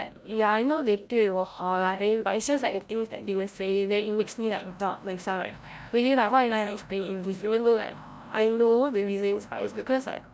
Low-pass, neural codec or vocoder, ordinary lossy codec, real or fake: none; codec, 16 kHz, 0.5 kbps, FreqCodec, larger model; none; fake